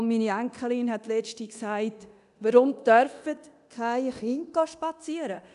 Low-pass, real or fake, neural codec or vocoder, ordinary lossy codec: 10.8 kHz; fake; codec, 24 kHz, 0.9 kbps, DualCodec; none